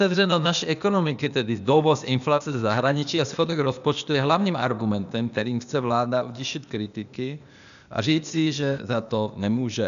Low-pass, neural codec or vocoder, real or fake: 7.2 kHz; codec, 16 kHz, 0.8 kbps, ZipCodec; fake